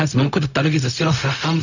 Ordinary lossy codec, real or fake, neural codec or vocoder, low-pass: none; fake; codec, 16 kHz, 0.4 kbps, LongCat-Audio-Codec; 7.2 kHz